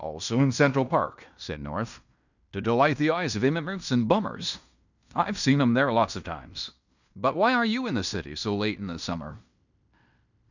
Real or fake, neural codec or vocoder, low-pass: fake; codec, 16 kHz in and 24 kHz out, 0.9 kbps, LongCat-Audio-Codec, fine tuned four codebook decoder; 7.2 kHz